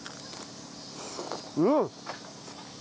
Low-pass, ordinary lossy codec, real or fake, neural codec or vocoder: none; none; real; none